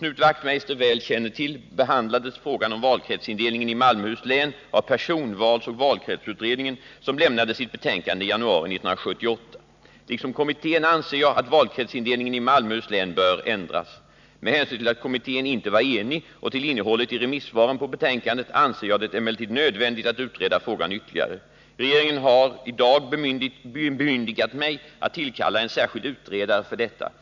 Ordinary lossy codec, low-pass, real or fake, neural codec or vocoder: none; 7.2 kHz; real; none